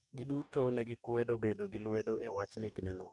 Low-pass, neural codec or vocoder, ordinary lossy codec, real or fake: 10.8 kHz; codec, 44.1 kHz, 2.6 kbps, DAC; none; fake